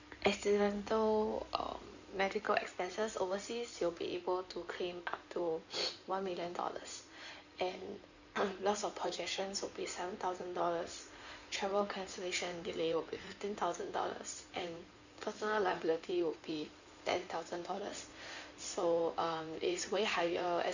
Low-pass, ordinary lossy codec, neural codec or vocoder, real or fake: 7.2 kHz; none; codec, 16 kHz in and 24 kHz out, 2.2 kbps, FireRedTTS-2 codec; fake